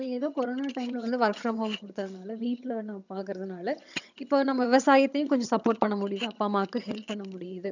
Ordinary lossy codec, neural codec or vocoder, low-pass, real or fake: none; vocoder, 22.05 kHz, 80 mel bands, HiFi-GAN; 7.2 kHz; fake